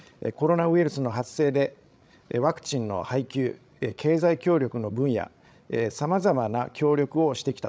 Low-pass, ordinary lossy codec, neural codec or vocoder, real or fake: none; none; codec, 16 kHz, 16 kbps, FreqCodec, larger model; fake